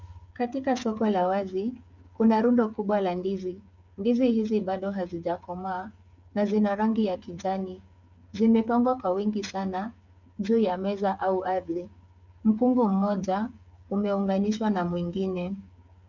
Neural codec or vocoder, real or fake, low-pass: codec, 16 kHz, 8 kbps, FreqCodec, smaller model; fake; 7.2 kHz